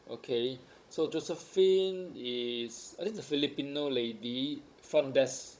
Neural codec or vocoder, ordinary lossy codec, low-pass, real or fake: codec, 16 kHz, 16 kbps, FunCodec, trained on Chinese and English, 50 frames a second; none; none; fake